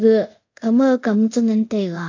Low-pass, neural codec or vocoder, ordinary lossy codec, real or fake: 7.2 kHz; codec, 24 kHz, 0.5 kbps, DualCodec; AAC, 48 kbps; fake